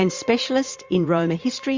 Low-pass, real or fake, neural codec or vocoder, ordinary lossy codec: 7.2 kHz; fake; vocoder, 44.1 kHz, 80 mel bands, Vocos; MP3, 64 kbps